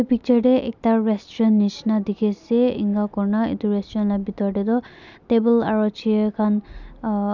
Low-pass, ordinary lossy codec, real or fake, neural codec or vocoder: 7.2 kHz; none; real; none